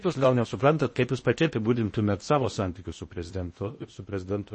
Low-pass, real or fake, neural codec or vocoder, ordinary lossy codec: 10.8 kHz; fake; codec, 16 kHz in and 24 kHz out, 0.6 kbps, FocalCodec, streaming, 2048 codes; MP3, 32 kbps